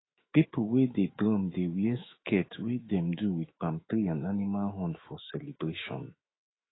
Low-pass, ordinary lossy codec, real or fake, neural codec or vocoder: 7.2 kHz; AAC, 16 kbps; fake; autoencoder, 48 kHz, 128 numbers a frame, DAC-VAE, trained on Japanese speech